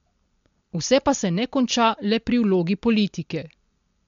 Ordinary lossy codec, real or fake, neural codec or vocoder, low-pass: MP3, 48 kbps; real; none; 7.2 kHz